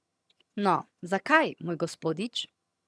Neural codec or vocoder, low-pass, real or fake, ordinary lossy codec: vocoder, 22.05 kHz, 80 mel bands, HiFi-GAN; none; fake; none